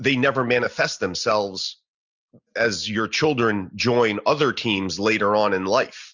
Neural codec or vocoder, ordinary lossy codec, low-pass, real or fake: none; Opus, 64 kbps; 7.2 kHz; real